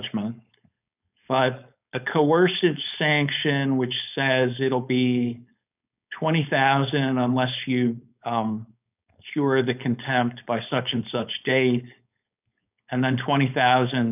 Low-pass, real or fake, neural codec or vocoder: 3.6 kHz; fake; codec, 16 kHz, 4.8 kbps, FACodec